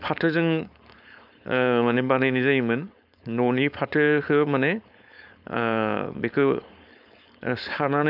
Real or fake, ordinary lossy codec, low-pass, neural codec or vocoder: fake; none; 5.4 kHz; codec, 16 kHz, 4.8 kbps, FACodec